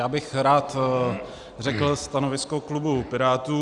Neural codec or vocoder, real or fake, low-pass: none; real; 10.8 kHz